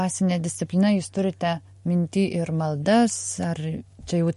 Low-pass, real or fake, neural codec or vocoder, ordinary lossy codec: 14.4 kHz; real; none; MP3, 48 kbps